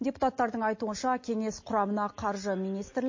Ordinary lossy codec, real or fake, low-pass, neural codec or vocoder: MP3, 32 kbps; real; 7.2 kHz; none